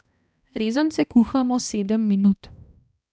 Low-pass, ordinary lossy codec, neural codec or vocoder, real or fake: none; none; codec, 16 kHz, 1 kbps, X-Codec, HuBERT features, trained on balanced general audio; fake